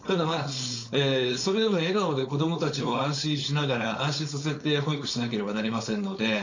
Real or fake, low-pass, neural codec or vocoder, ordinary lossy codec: fake; 7.2 kHz; codec, 16 kHz, 4.8 kbps, FACodec; AAC, 48 kbps